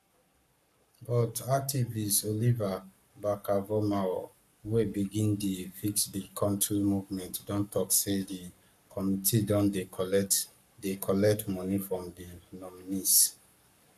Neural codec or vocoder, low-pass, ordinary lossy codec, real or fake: codec, 44.1 kHz, 7.8 kbps, Pupu-Codec; 14.4 kHz; none; fake